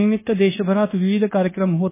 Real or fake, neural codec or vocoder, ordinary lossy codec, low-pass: fake; codec, 16 kHz, 0.9 kbps, LongCat-Audio-Codec; MP3, 16 kbps; 3.6 kHz